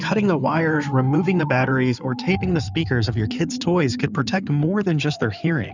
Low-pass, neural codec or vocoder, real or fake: 7.2 kHz; codec, 16 kHz in and 24 kHz out, 2.2 kbps, FireRedTTS-2 codec; fake